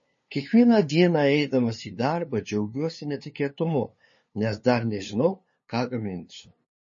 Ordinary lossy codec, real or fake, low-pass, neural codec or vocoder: MP3, 32 kbps; fake; 7.2 kHz; codec, 16 kHz, 2 kbps, FunCodec, trained on LibriTTS, 25 frames a second